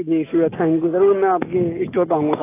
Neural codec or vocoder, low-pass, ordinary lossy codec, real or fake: none; 3.6 kHz; AAC, 16 kbps; real